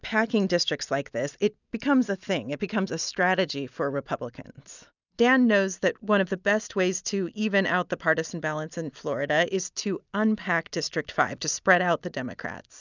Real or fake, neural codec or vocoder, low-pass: real; none; 7.2 kHz